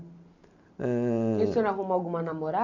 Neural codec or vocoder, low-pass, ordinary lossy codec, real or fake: none; 7.2 kHz; none; real